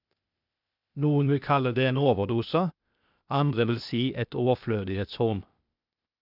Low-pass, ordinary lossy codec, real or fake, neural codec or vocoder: 5.4 kHz; none; fake; codec, 16 kHz, 0.8 kbps, ZipCodec